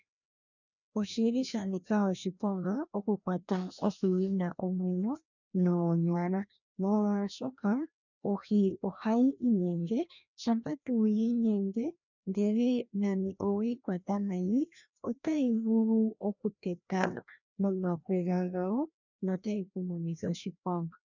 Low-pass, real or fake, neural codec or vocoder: 7.2 kHz; fake; codec, 16 kHz, 1 kbps, FreqCodec, larger model